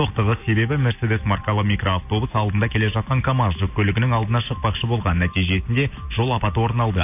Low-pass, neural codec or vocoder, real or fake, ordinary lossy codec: 3.6 kHz; none; real; AAC, 32 kbps